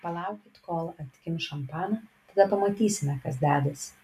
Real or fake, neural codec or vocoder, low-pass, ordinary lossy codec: real; none; 14.4 kHz; MP3, 96 kbps